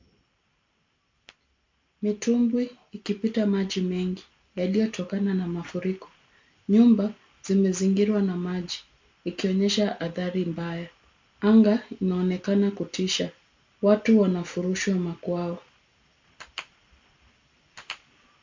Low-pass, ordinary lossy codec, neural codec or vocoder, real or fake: 7.2 kHz; MP3, 64 kbps; none; real